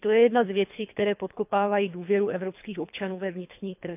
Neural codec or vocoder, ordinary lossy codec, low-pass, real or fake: codec, 24 kHz, 3 kbps, HILCodec; none; 3.6 kHz; fake